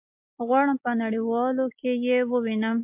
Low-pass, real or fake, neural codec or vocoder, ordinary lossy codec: 3.6 kHz; real; none; MP3, 32 kbps